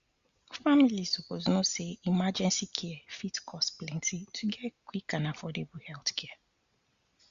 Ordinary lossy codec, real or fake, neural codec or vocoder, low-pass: Opus, 64 kbps; real; none; 7.2 kHz